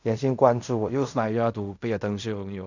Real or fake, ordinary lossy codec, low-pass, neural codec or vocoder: fake; none; 7.2 kHz; codec, 16 kHz in and 24 kHz out, 0.4 kbps, LongCat-Audio-Codec, fine tuned four codebook decoder